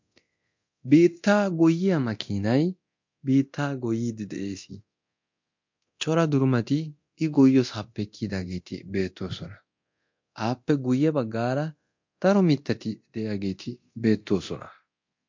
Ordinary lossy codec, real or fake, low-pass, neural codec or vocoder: MP3, 48 kbps; fake; 7.2 kHz; codec, 24 kHz, 0.9 kbps, DualCodec